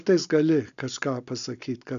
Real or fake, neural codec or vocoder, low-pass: real; none; 7.2 kHz